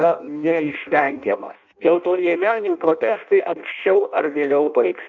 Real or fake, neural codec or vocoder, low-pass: fake; codec, 16 kHz in and 24 kHz out, 0.6 kbps, FireRedTTS-2 codec; 7.2 kHz